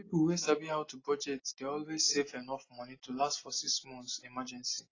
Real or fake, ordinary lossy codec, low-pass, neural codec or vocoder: real; AAC, 32 kbps; 7.2 kHz; none